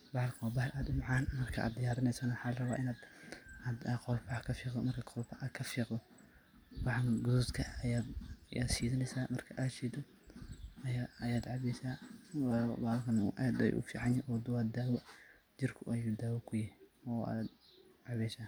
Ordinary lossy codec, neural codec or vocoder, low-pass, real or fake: none; none; none; real